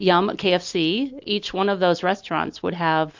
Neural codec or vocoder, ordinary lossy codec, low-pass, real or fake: none; MP3, 48 kbps; 7.2 kHz; real